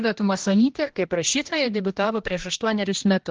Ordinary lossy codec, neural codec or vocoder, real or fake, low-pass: Opus, 16 kbps; codec, 16 kHz, 1 kbps, X-Codec, HuBERT features, trained on general audio; fake; 7.2 kHz